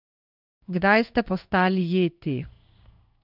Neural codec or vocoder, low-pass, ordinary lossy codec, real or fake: codec, 16 kHz in and 24 kHz out, 1 kbps, XY-Tokenizer; 5.4 kHz; none; fake